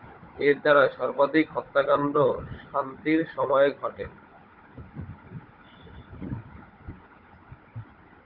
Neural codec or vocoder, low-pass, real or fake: codec, 16 kHz, 16 kbps, FunCodec, trained on Chinese and English, 50 frames a second; 5.4 kHz; fake